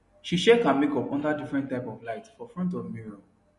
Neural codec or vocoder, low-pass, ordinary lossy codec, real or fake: none; 14.4 kHz; MP3, 48 kbps; real